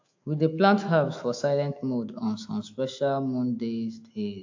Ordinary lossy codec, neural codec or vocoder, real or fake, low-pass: AAC, 48 kbps; autoencoder, 48 kHz, 128 numbers a frame, DAC-VAE, trained on Japanese speech; fake; 7.2 kHz